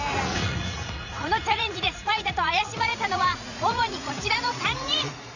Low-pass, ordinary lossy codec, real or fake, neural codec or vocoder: 7.2 kHz; none; fake; vocoder, 44.1 kHz, 80 mel bands, Vocos